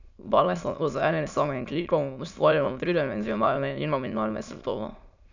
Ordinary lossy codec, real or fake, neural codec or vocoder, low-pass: none; fake; autoencoder, 22.05 kHz, a latent of 192 numbers a frame, VITS, trained on many speakers; 7.2 kHz